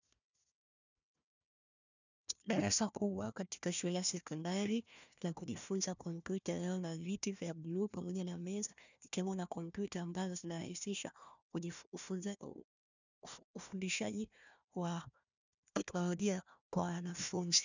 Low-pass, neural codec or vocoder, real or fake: 7.2 kHz; codec, 16 kHz, 1 kbps, FunCodec, trained on Chinese and English, 50 frames a second; fake